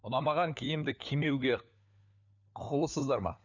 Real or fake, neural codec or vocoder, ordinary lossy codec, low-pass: fake; codec, 16 kHz, 4 kbps, FunCodec, trained on LibriTTS, 50 frames a second; none; 7.2 kHz